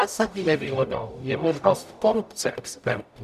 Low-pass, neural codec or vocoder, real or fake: 14.4 kHz; codec, 44.1 kHz, 0.9 kbps, DAC; fake